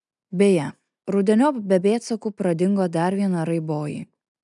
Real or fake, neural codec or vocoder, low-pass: real; none; 10.8 kHz